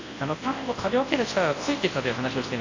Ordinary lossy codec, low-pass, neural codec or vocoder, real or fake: AAC, 32 kbps; 7.2 kHz; codec, 24 kHz, 0.9 kbps, WavTokenizer, large speech release; fake